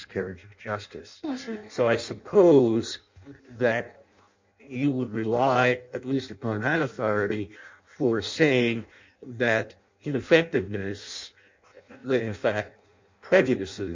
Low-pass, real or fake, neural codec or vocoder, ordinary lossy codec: 7.2 kHz; fake; codec, 16 kHz in and 24 kHz out, 0.6 kbps, FireRedTTS-2 codec; MP3, 64 kbps